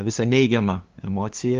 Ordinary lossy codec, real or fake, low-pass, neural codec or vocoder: Opus, 24 kbps; fake; 7.2 kHz; codec, 16 kHz, 2 kbps, FunCodec, trained on LibriTTS, 25 frames a second